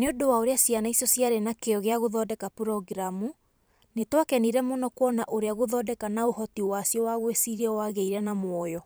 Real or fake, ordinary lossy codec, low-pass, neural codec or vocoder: fake; none; none; vocoder, 44.1 kHz, 128 mel bands every 512 samples, BigVGAN v2